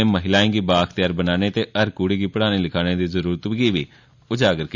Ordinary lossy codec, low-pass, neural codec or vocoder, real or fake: none; 7.2 kHz; none; real